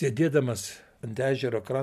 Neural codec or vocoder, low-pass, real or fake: none; 14.4 kHz; real